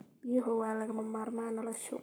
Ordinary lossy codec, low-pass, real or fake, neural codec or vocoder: none; none; fake; codec, 44.1 kHz, 7.8 kbps, Pupu-Codec